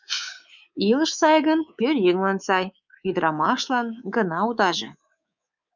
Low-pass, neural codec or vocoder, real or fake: 7.2 kHz; codec, 24 kHz, 3.1 kbps, DualCodec; fake